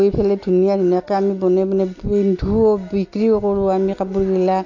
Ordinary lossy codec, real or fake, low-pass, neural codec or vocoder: AAC, 48 kbps; real; 7.2 kHz; none